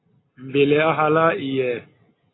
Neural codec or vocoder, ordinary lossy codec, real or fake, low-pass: none; AAC, 16 kbps; real; 7.2 kHz